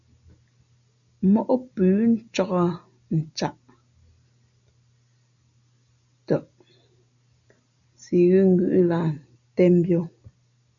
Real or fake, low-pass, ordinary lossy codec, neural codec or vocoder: real; 7.2 kHz; MP3, 96 kbps; none